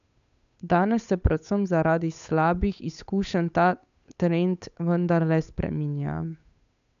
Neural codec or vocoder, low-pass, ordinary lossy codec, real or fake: codec, 16 kHz, 8 kbps, FunCodec, trained on Chinese and English, 25 frames a second; 7.2 kHz; none; fake